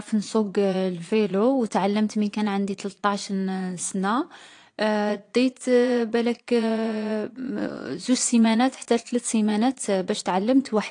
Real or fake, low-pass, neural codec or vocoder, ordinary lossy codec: fake; 9.9 kHz; vocoder, 22.05 kHz, 80 mel bands, Vocos; AAC, 48 kbps